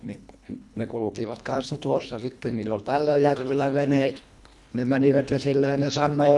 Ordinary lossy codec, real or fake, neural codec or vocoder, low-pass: none; fake; codec, 24 kHz, 1.5 kbps, HILCodec; none